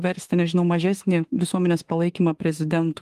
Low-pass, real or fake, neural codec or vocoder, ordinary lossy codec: 14.4 kHz; fake; autoencoder, 48 kHz, 32 numbers a frame, DAC-VAE, trained on Japanese speech; Opus, 24 kbps